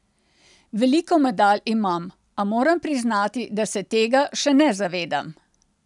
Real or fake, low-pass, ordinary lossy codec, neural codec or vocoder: real; 10.8 kHz; none; none